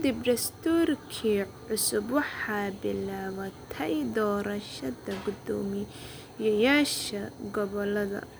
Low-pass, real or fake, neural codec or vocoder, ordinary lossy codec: none; real; none; none